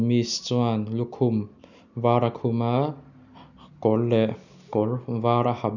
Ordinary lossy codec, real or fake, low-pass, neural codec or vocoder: none; real; 7.2 kHz; none